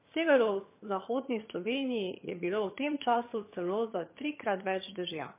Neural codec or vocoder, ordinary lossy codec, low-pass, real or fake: vocoder, 22.05 kHz, 80 mel bands, HiFi-GAN; MP3, 24 kbps; 3.6 kHz; fake